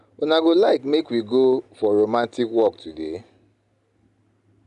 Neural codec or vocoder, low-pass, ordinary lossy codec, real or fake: none; 10.8 kHz; MP3, 96 kbps; real